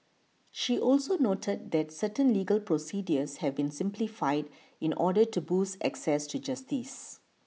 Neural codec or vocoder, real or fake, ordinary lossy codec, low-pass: none; real; none; none